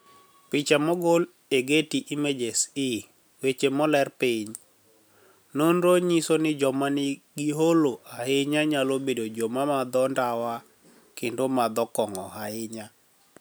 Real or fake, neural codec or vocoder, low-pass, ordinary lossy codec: real; none; none; none